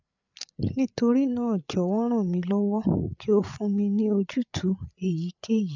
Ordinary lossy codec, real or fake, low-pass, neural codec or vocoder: none; fake; 7.2 kHz; codec, 16 kHz, 8 kbps, FreqCodec, larger model